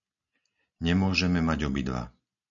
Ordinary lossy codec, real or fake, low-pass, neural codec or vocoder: MP3, 96 kbps; real; 7.2 kHz; none